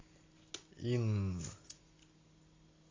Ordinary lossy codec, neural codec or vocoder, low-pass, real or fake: AAC, 32 kbps; none; 7.2 kHz; real